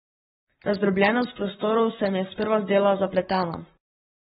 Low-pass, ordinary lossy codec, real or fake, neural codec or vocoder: 19.8 kHz; AAC, 16 kbps; fake; codec, 44.1 kHz, 7.8 kbps, Pupu-Codec